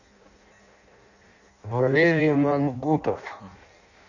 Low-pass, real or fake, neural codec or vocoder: 7.2 kHz; fake; codec, 16 kHz in and 24 kHz out, 0.6 kbps, FireRedTTS-2 codec